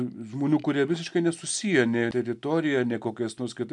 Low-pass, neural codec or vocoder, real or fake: 10.8 kHz; none; real